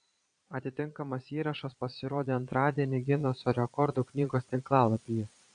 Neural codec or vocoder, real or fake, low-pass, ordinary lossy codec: vocoder, 22.05 kHz, 80 mel bands, Vocos; fake; 9.9 kHz; MP3, 96 kbps